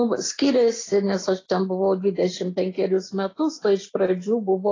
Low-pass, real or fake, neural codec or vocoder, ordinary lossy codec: 7.2 kHz; real; none; AAC, 32 kbps